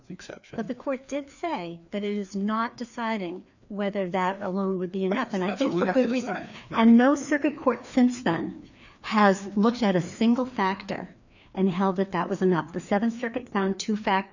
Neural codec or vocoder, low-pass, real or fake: codec, 16 kHz, 2 kbps, FreqCodec, larger model; 7.2 kHz; fake